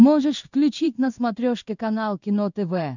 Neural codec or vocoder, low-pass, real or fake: codec, 16 kHz in and 24 kHz out, 1 kbps, XY-Tokenizer; 7.2 kHz; fake